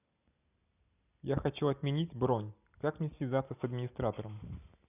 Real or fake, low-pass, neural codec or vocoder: real; 3.6 kHz; none